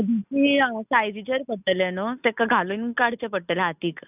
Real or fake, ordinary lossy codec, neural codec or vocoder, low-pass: real; none; none; 3.6 kHz